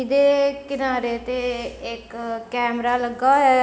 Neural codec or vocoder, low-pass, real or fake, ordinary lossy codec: none; none; real; none